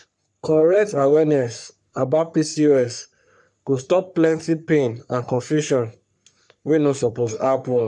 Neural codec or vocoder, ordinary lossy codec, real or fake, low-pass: codec, 44.1 kHz, 3.4 kbps, Pupu-Codec; none; fake; 10.8 kHz